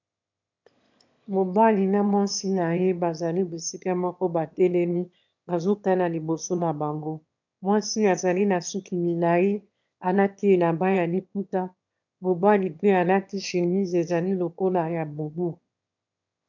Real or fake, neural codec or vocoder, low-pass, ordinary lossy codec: fake; autoencoder, 22.05 kHz, a latent of 192 numbers a frame, VITS, trained on one speaker; 7.2 kHz; MP3, 64 kbps